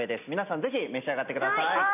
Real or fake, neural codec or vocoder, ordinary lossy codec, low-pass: real; none; none; 3.6 kHz